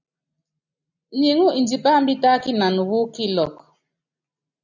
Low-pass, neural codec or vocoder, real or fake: 7.2 kHz; none; real